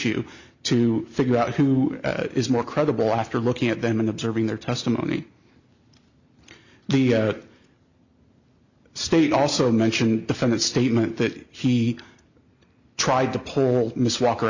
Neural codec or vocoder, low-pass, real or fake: none; 7.2 kHz; real